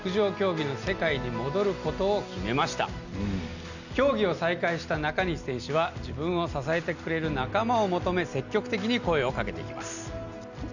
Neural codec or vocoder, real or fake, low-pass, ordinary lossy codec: none; real; 7.2 kHz; none